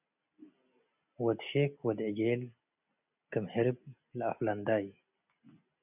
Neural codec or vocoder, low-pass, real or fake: none; 3.6 kHz; real